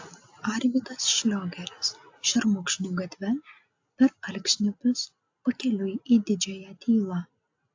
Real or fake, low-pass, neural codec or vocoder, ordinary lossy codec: real; 7.2 kHz; none; AAC, 48 kbps